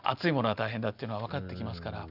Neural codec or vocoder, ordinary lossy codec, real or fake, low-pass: none; none; real; 5.4 kHz